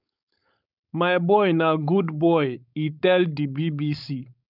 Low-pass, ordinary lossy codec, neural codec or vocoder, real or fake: 5.4 kHz; none; codec, 16 kHz, 4.8 kbps, FACodec; fake